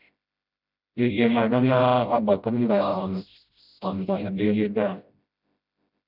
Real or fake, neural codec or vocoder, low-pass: fake; codec, 16 kHz, 0.5 kbps, FreqCodec, smaller model; 5.4 kHz